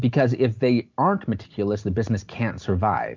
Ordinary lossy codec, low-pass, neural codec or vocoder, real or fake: MP3, 64 kbps; 7.2 kHz; none; real